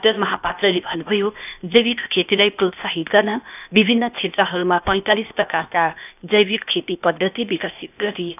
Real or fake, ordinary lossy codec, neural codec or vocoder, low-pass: fake; none; codec, 16 kHz, 0.8 kbps, ZipCodec; 3.6 kHz